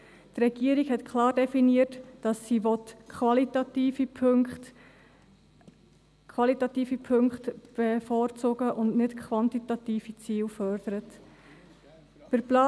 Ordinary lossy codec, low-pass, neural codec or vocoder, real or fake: none; none; none; real